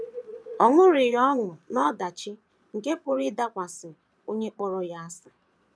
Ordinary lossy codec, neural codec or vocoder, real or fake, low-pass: none; vocoder, 22.05 kHz, 80 mel bands, Vocos; fake; none